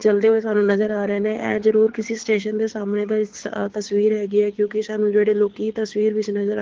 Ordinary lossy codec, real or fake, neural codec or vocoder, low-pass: Opus, 16 kbps; fake; codec, 24 kHz, 6 kbps, HILCodec; 7.2 kHz